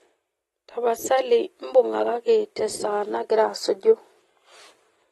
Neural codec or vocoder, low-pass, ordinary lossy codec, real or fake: none; 19.8 kHz; AAC, 32 kbps; real